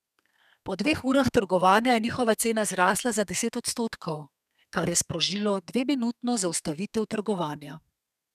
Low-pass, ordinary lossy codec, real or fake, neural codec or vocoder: 14.4 kHz; none; fake; codec, 32 kHz, 1.9 kbps, SNAC